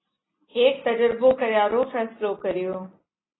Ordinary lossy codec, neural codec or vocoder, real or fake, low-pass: AAC, 16 kbps; none; real; 7.2 kHz